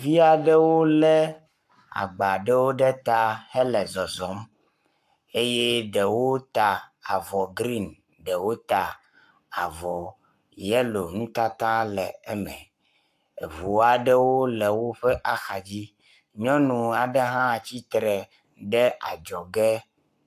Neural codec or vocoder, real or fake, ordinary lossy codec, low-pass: codec, 44.1 kHz, 7.8 kbps, Pupu-Codec; fake; AAC, 96 kbps; 14.4 kHz